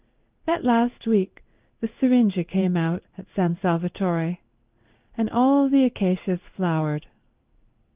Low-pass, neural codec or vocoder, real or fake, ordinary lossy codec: 3.6 kHz; codec, 16 kHz in and 24 kHz out, 1 kbps, XY-Tokenizer; fake; Opus, 24 kbps